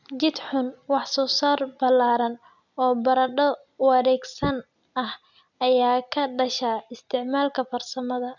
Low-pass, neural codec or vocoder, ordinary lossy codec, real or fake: 7.2 kHz; none; none; real